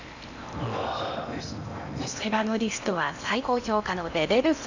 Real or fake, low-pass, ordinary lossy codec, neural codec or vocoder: fake; 7.2 kHz; none; codec, 16 kHz in and 24 kHz out, 0.8 kbps, FocalCodec, streaming, 65536 codes